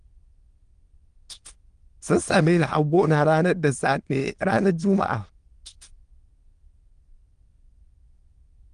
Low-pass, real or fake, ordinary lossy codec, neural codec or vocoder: 9.9 kHz; fake; Opus, 24 kbps; autoencoder, 22.05 kHz, a latent of 192 numbers a frame, VITS, trained on many speakers